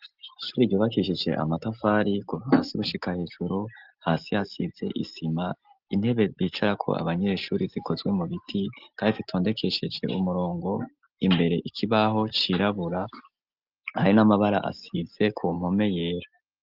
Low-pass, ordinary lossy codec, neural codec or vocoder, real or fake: 5.4 kHz; Opus, 24 kbps; none; real